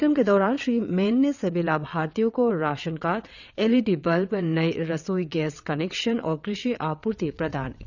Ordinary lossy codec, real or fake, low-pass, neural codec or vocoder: none; fake; 7.2 kHz; vocoder, 22.05 kHz, 80 mel bands, WaveNeXt